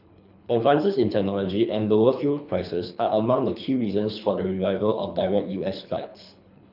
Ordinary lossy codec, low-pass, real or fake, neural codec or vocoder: none; 5.4 kHz; fake; codec, 24 kHz, 3 kbps, HILCodec